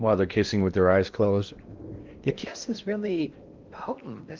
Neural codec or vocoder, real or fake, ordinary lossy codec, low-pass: codec, 16 kHz in and 24 kHz out, 0.8 kbps, FocalCodec, streaming, 65536 codes; fake; Opus, 32 kbps; 7.2 kHz